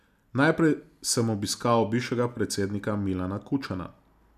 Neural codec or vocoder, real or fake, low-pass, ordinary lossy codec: none; real; 14.4 kHz; none